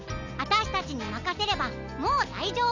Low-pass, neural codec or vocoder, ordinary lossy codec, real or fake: 7.2 kHz; none; none; real